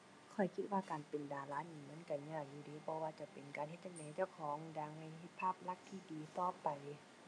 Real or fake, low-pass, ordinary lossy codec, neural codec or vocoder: real; none; none; none